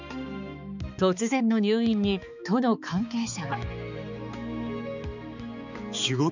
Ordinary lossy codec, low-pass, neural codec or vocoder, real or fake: none; 7.2 kHz; codec, 16 kHz, 4 kbps, X-Codec, HuBERT features, trained on balanced general audio; fake